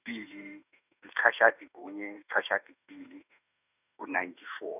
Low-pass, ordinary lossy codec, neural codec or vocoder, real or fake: 3.6 kHz; none; autoencoder, 48 kHz, 32 numbers a frame, DAC-VAE, trained on Japanese speech; fake